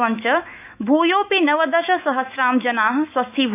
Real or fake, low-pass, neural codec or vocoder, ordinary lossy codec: fake; 3.6 kHz; autoencoder, 48 kHz, 128 numbers a frame, DAC-VAE, trained on Japanese speech; none